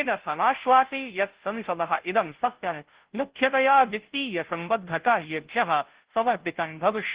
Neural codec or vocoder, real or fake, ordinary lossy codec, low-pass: codec, 16 kHz, 0.5 kbps, FunCodec, trained on Chinese and English, 25 frames a second; fake; Opus, 16 kbps; 3.6 kHz